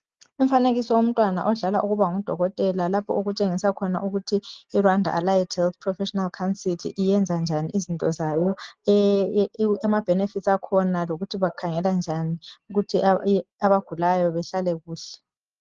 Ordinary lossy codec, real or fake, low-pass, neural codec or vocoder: Opus, 16 kbps; real; 7.2 kHz; none